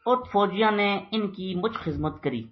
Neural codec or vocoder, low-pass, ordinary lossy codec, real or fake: none; 7.2 kHz; MP3, 24 kbps; real